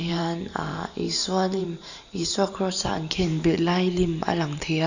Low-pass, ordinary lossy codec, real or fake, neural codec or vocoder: 7.2 kHz; none; fake; vocoder, 44.1 kHz, 128 mel bands every 512 samples, BigVGAN v2